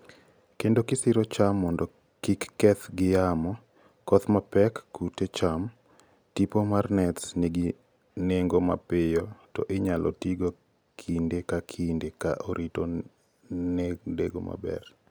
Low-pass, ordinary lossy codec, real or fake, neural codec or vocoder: none; none; real; none